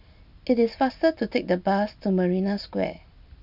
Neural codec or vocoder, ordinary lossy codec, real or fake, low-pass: none; none; real; 5.4 kHz